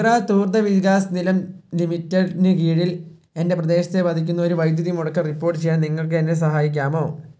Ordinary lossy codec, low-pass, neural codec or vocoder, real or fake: none; none; none; real